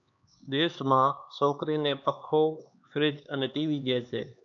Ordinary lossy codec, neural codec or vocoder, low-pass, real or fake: MP3, 96 kbps; codec, 16 kHz, 2 kbps, X-Codec, HuBERT features, trained on LibriSpeech; 7.2 kHz; fake